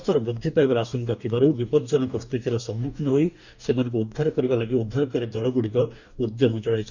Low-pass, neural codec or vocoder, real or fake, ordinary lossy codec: 7.2 kHz; codec, 44.1 kHz, 2.6 kbps, DAC; fake; none